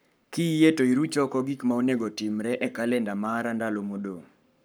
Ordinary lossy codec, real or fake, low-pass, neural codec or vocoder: none; fake; none; codec, 44.1 kHz, 7.8 kbps, Pupu-Codec